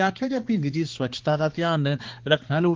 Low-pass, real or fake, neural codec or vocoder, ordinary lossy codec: 7.2 kHz; fake; codec, 16 kHz, 2 kbps, X-Codec, HuBERT features, trained on balanced general audio; Opus, 16 kbps